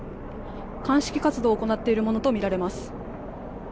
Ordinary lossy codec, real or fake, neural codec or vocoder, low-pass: none; real; none; none